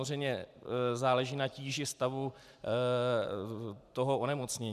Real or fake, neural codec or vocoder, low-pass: fake; vocoder, 44.1 kHz, 128 mel bands every 512 samples, BigVGAN v2; 14.4 kHz